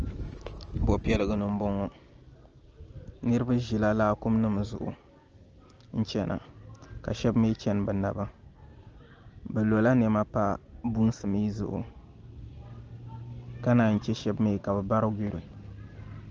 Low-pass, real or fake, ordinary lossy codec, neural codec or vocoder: 7.2 kHz; real; Opus, 24 kbps; none